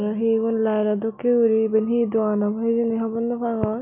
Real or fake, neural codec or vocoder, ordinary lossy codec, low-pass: real; none; none; 3.6 kHz